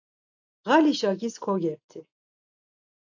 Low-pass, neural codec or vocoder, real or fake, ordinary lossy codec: 7.2 kHz; none; real; AAC, 48 kbps